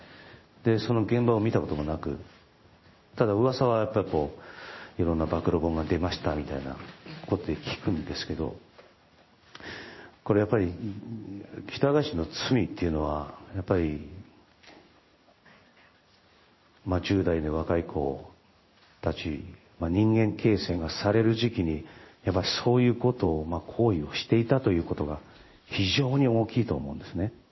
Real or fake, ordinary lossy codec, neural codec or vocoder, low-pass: fake; MP3, 24 kbps; codec, 16 kHz in and 24 kHz out, 1 kbps, XY-Tokenizer; 7.2 kHz